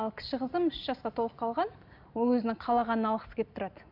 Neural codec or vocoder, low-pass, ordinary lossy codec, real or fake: none; 5.4 kHz; none; real